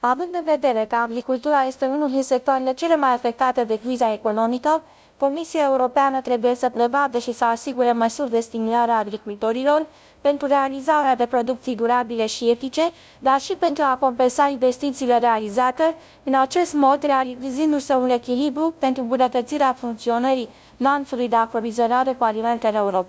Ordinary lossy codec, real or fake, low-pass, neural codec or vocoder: none; fake; none; codec, 16 kHz, 0.5 kbps, FunCodec, trained on LibriTTS, 25 frames a second